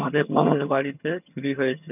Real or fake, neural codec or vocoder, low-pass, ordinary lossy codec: fake; vocoder, 22.05 kHz, 80 mel bands, HiFi-GAN; 3.6 kHz; none